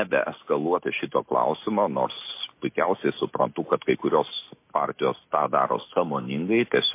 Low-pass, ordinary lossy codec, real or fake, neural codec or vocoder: 3.6 kHz; MP3, 24 kbps; real; none